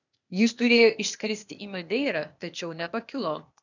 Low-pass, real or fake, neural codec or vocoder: 7.2 kHz; fake; codec, 16 kHz, 0.8 kbps, ZipCodec